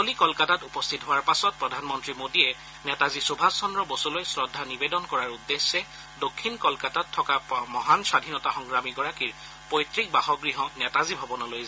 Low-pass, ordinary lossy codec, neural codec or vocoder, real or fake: none; none; none; real